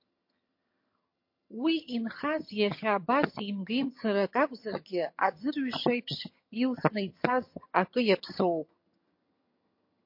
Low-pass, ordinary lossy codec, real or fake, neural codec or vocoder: 5.4 kHz; MP3, 32 kbps; fake; vocoder, 22.05 kHz, 80 mel bands, HiFi-GAN